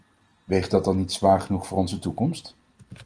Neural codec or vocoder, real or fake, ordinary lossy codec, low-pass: none; real; Opus, 24 kbps; 9.9 kHz